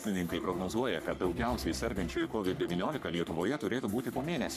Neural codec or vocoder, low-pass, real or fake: codec, 44.1 kHz, 3.4 kbps, Pupu-Codec; 14.4 kHz; fake